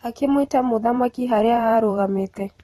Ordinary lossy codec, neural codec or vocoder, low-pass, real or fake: AAC, 32 kbps; vocoder, 44.1 kHz, 128 mel bands every 512 samples, BigVGAN v2; 19.8 kHz; fake